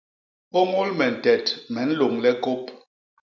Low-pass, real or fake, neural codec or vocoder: 7.2 kHz; real; none